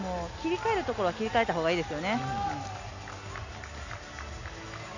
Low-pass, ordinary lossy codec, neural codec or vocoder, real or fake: 7.2 kHz; none; none; real